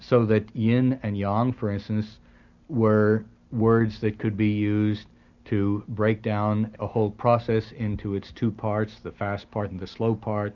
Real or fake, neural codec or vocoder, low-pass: real; none; 7.2 kHz